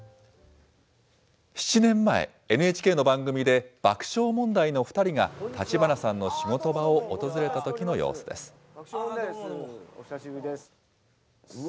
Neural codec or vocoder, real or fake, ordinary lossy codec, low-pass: none; real; none; none